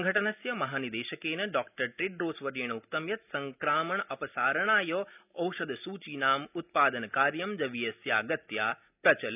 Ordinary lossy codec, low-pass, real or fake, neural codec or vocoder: none; 3.6 kHz; real; none